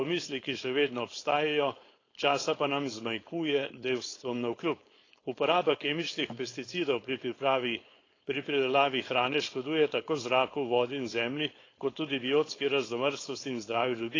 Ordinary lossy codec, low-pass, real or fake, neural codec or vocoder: AAC, 32 kbps; 7.2 kHz; fake; codec, 16 kHz, 4.8 kbps, FACodec